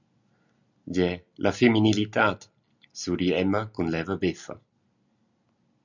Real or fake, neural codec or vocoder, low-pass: real; none; 7.2 kHz